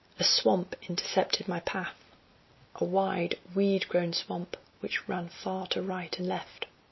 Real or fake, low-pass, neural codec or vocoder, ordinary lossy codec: real; 7.2 kHz; none; MP3, 24 kbps